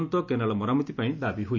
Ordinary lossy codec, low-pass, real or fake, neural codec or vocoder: none; 7.2 kHz; real; none